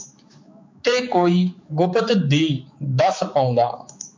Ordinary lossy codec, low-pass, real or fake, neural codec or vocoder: MP3, 48 kbps; 7.2 kHz; fake; codec, 16 kHz, 4 kbps, X-Codec, HuBERT features, trained on balanced general audio